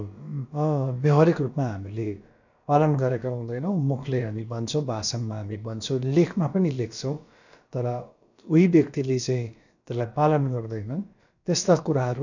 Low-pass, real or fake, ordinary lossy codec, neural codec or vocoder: 7.2 kHz; fake; none; codec, 16 kHz, about 1 kbps, DyCAST, with the encoder's durations